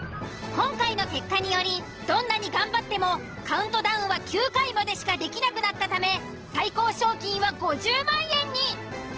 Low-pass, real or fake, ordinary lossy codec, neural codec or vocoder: 7.2 kHz; real; Opus, 16 kbps; none